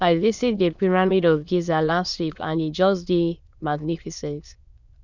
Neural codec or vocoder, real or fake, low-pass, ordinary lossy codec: autoencoder, 22.05 kHz, a latent of 192 numbers a frame, VITS, trained on many speakers; fake; 7.2 kHz; none